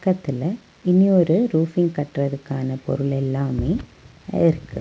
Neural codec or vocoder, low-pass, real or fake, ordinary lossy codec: none; none; real; none